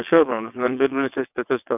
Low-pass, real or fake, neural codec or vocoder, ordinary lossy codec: 3.6 kHz; fake; vocoder, 22.05 kHz, 80 mel bands, WaveNeXt; none